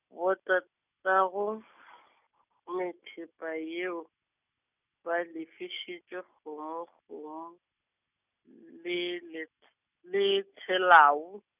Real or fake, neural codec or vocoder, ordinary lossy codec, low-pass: real; none; none; 3.6 kHz